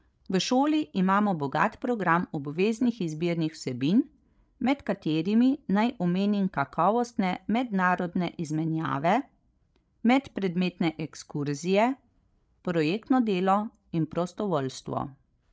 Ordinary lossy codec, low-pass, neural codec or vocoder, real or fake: none; none; codec, 16 kHz, 16 kbps, FreqCodec, larger model; fake